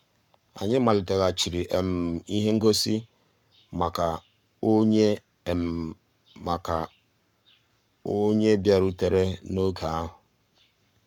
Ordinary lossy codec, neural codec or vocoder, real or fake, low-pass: none; codec, 44.1 kHz, 7.8 kbps, Pupu-Codec; fake; 19.8 kHz